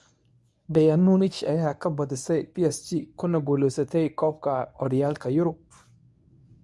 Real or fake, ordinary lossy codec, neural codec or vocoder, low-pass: fake; none; codec, 24 kHz, 0.9 kbps, WavTokenizer, medium speech release version 1; none